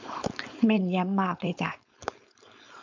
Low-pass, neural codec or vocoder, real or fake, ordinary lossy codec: 7.2 kHz; codec, 16 kHz, 4.8 kbps, FACodec; fake; MP3, 64 kbps